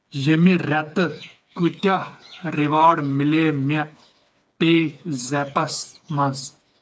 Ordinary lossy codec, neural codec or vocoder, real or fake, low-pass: none; codec, 16 kHz, 4 kbps, FreqCodec, smaller model; fake; none